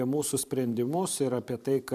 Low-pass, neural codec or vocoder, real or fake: 14.4 kHz; vocoder, 44.1 kHz, 128 mel bands every 512 samples, BigVGAN v2; fake